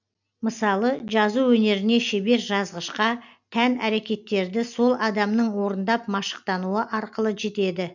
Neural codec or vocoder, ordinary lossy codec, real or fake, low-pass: none; none; real; 7.2 kHz